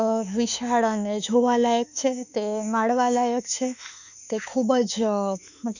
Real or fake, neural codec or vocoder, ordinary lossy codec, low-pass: fake; autoencoder, 48 kHz, 32 numbers a frame, DAC-VAE, trained on Japanese speech; none; 7.2 kHz